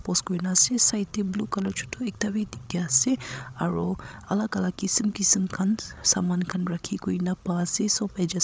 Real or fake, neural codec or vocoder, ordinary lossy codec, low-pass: fake; codec, 16 kHz, 16 kbps, FreqCodec, larger model; none; none